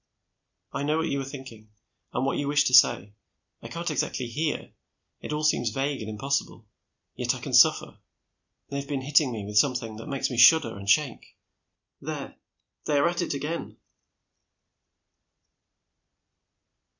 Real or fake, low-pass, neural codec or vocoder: real; 7.2 kHz; none